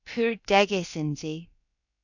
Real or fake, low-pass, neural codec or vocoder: fake; 7.2 kHz; codec, 16 kHz, about 1 kbps, DyCAST, with the encoder's durations